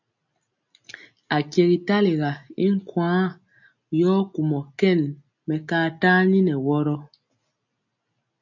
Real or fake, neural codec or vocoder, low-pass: real; none; 7.2 kHz